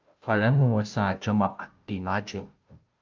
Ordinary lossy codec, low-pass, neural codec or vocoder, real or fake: Opus, 24 kbps; 7.2 kHz; codec, 16 kHz, 0.5 kbps, FunCodec, trained on Chinese and English, 25 frames a second; fake